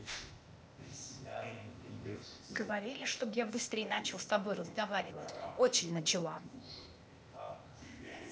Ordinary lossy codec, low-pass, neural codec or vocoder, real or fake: none; none; codec, 16 kHz, 0.8 kbps, ZipCodec; fake